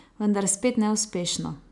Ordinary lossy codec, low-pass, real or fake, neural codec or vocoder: none; 10.8 kHz; real; none